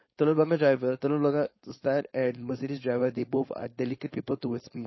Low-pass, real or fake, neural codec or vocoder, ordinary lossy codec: 7.2 kHz; fake; codec, 16 kHz, 4.8 kbps, FACodec; MP3, 24 kbps